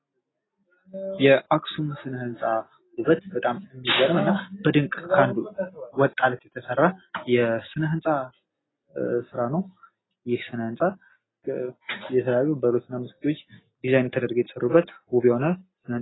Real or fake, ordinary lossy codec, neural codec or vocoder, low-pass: real; AAC, 16 kbps; none; 7.2 kHz